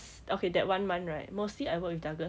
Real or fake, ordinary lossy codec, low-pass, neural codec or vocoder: real; none; none; none